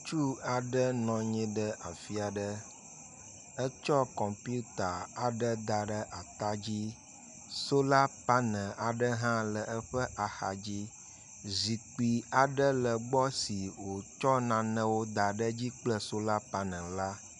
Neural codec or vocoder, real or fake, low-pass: none; real; 10.8 kHz